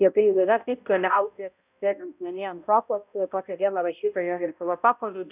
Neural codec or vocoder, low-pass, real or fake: codec, 16 kHz, 0.5 kbps, X-Codec, HuBERT features, trained on balanced general audio; 3.6 kHz; fake